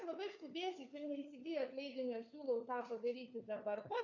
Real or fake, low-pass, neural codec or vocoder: fake; 7.2 kHz; codec, 16 kHz, 4 kbps, FunCodec, trained on LibriTTS, 50 frames a second